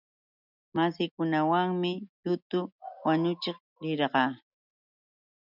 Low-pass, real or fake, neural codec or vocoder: 5.4 kHz; real; none